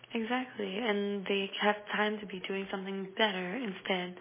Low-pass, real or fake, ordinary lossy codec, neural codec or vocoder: 3.6 kHz; real; MP3, 16 kbps; none